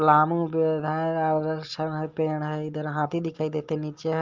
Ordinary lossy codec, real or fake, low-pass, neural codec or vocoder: Opus, 32 kbps; real; 7.2 kHz; none